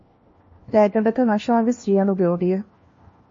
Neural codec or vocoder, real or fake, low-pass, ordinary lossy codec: codec, 16 kHz, 1 kbps, FunCodec, trained on LibriTTS, 50 frames a second; fake; 7.2 kHz; MP3, 32 kbps